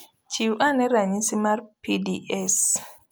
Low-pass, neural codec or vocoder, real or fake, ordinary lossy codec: none; none; real; none